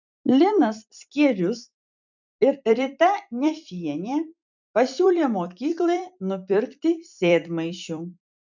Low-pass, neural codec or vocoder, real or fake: 7.2 kHz; vocoder, 44.1 kHz, 128 mel bands every 256 samples, BigVGAN v2; fake